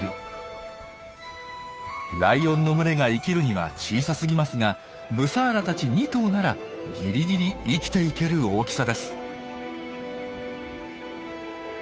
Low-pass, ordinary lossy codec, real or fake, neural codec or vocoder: none; none; fake; codec, 16 kHz, 2 kbps, FunCodec, trained on Chinese and English, 25 frames a second